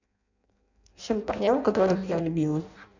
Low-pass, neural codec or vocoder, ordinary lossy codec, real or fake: 7.2 kHz; codec, 16 kHz in and 24 kHz out, 0.6 kbps, FireRedTTS-2 codec; none; fake